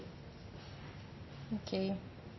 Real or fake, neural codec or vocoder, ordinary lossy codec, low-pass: real; none; MP3, 24 kbps; 7.2 kHz